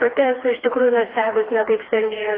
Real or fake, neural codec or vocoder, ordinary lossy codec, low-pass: fake; codec, 16 kHz, 2 kbps, FreqCodec, smaller model; AAC, 24 kbps; 5.4 kHz